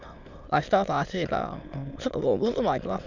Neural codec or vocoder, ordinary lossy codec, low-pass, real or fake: autoencoder, 22.05 kHz, a latent of 192 numbers a frame, VITS, trained on many speakers; none; 7.2 kHz; fake